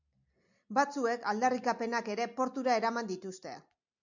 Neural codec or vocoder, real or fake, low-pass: none; real; 7.2 kHz